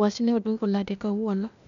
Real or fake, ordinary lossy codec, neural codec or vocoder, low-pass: fake; none; codec, 16 kHz, 0.8 kbps, ZipCodec; 7.2 kHz